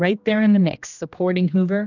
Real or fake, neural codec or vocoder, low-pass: fake; codec, 16 kHz, 1 kbps, X-Codec, HuBERT features, trained on general audio; 7.2 kHz